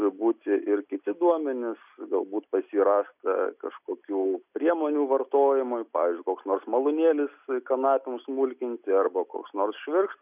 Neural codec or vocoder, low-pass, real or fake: none; 3.6 kHz; real